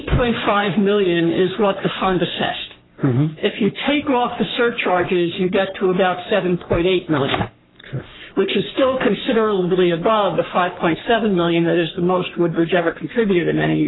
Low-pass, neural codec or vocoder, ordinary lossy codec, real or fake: 7.2 kHz; codec, 44.1 kHz, 3.4 kbps, Pupu-Codec; AAC, 16 kbps; fake